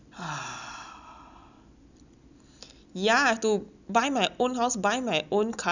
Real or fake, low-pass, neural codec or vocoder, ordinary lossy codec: real; 7.2 kHz; none; none